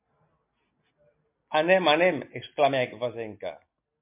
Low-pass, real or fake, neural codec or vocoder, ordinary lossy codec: 3.6 kHz; real; none; MP3, 32 kbps